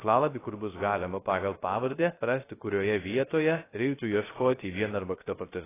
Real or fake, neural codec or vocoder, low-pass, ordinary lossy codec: fake; codec, 16 kHz, 0.3 kbps, FocalCodec; 3.6 kHz; AAC, 16 kbps